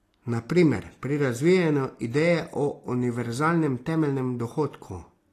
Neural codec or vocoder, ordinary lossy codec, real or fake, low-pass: none; AAC, 48 kbps; real; 14.4 kHz